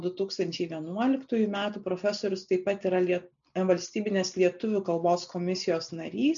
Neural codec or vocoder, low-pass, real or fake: none; 7.2 kHz; real